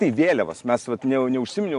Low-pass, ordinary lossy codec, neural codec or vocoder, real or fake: 10.8 kHz; AAC, 64 kbps; none; real